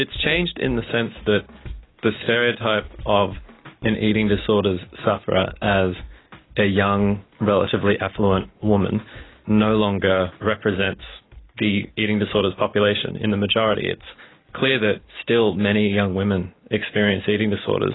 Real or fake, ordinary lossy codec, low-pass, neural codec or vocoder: real; AAC, 16 kbps; 7.2 kHz; none